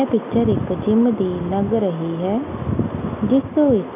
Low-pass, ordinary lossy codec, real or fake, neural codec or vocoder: 3.6 kHz; none; real; none